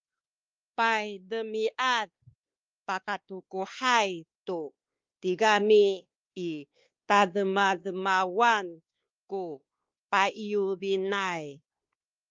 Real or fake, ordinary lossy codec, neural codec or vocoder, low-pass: fake; Opus, 32 kbps; codec, 16 kHz, 2 kbps, X-Codec, WavLM features, trained on Multilingual LibriSpeech; 7.2 kHz